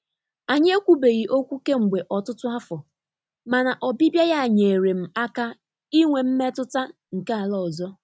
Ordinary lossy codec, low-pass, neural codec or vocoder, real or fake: none; none; none; real